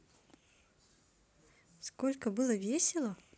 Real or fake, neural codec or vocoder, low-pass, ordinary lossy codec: real; none; none; none